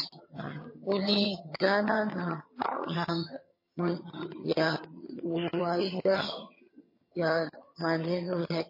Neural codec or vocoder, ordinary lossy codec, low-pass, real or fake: vocoder, 22.05 kHz, 80 mel bands, HiFi-GAN; MP3, 24 kbps; 5.4 kHz; fake